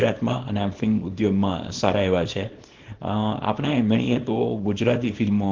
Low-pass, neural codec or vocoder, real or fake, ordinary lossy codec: 7.2 kHz; codec, 24 kHz, 0.9 kbps, WavTokenizer, small release; fake; Opus, 16 kbps